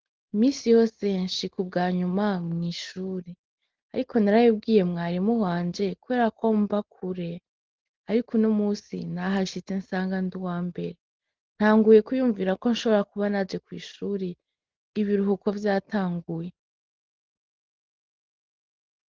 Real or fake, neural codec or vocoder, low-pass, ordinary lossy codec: real; none; 7.2 kHz; Opus, 16 kbps